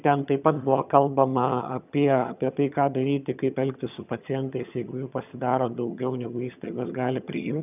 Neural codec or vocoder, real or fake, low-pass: vocoder, 22.05 kHz, 80 mel bands, HiFi-GAN; fake; 3.6 kHz